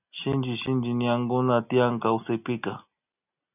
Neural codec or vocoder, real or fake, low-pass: none; real; 3.6 kHz